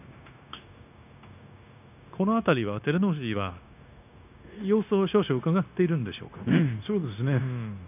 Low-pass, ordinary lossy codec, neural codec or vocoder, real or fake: 3.6 kHz; none; codec, 16 kHz, 0.9 kbps, LongCat-Audio-Codec; fake